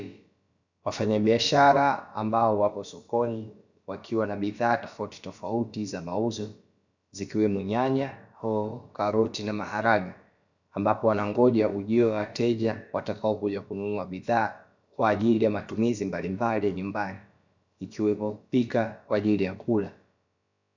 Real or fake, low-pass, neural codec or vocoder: fake; 7.2 kHz; codec, 16 kHz, about 1 kbps, DyCAST, with the encoder's durations